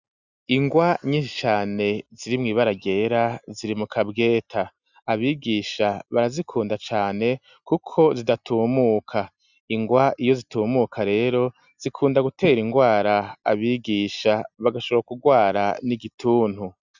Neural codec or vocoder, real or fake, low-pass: none; real; 7.2 kHz